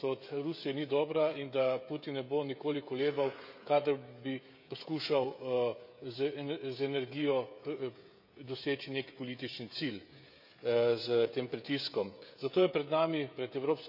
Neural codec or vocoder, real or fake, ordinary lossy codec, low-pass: codec, 16 kHz, 16 kbps, FreqCodec, smaller model; fake; none; 5.4 kHz